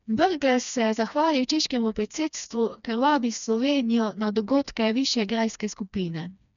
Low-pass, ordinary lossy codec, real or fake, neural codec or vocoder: 7.2 kHz; none; fake; codec, 16 kHz, 2 kbps, FreqCodec, smaller model